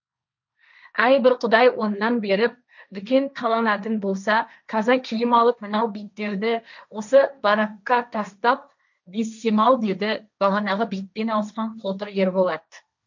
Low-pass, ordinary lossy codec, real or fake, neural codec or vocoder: 7.2 kHz; none; fake; codec, 16 kHz, 1.1 kbps, Voila-Tokenizer